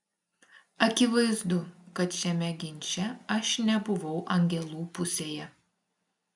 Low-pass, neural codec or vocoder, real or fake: 10.8 kHz; none; real